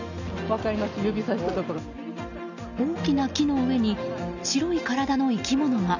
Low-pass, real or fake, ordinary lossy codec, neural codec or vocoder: 7.2 kHz; real; none; none